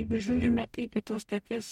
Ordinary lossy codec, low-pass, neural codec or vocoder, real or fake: MP3, 64 kbps; 19.8 kHz; codec, 44.1 kHz, 0.9 kbps, DAC; fake